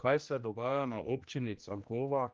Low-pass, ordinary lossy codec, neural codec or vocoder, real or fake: 7.2 kHz; Opus, 24 kbps; codec, 16 kHz, 1 kbps, X-Codec, HuBERT features, trained on general audio; fake